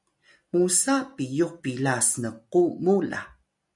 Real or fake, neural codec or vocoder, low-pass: real; none; 10.8 kHz